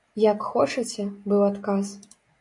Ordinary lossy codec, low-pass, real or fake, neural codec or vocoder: MP3, 64 kbps; 10.8 kHz; real; none